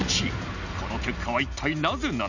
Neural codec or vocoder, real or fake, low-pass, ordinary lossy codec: none; real; 7.2 kHz; none